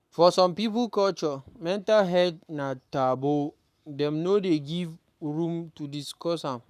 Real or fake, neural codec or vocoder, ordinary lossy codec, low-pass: fake; codec, 44.1 kHz, 7.8 kbps, Pupu-Codec; none; 14.4 kHz